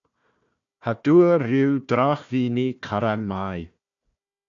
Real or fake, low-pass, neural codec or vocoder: fake; 7.2 kHz; codec, 16 kHz, 1 kbps, FunCodec, trained on Chinese and English, 50 frames a second